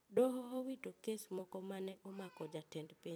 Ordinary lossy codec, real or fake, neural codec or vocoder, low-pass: none; fake; vocoder, 44.1 kHz, 128 mel bands every 256 samples, BigVGAN v2; none